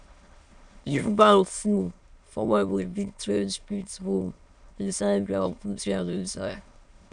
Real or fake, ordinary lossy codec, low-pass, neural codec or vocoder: fake; none; 9.9 kHz; autoencoder, 22.05 kHz, a latent of 192 numbers a frame, VITS, trained on many speakers